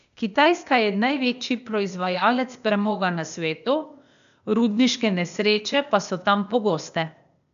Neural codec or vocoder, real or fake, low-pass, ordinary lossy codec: codec, 16 kHz, 0.8 kbps, ZipCodec; fake; 7.2 kHz; none